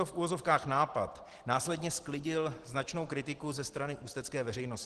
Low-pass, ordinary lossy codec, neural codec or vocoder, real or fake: 10.8 kHz; Opus, 16 kbps; none; real